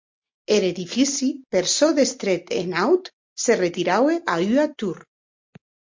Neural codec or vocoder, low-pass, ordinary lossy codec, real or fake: none; 7.2 kHz; MP3, 48 kbps; real